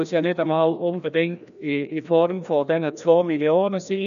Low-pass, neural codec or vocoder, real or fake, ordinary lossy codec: 7.2 kHz; codec, 16 kHz, 1 kbps, FreqCodec, larger model; fake; MP3, 96 kbps